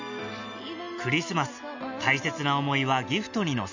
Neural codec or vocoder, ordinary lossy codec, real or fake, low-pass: none; none; real; 7.2 kHz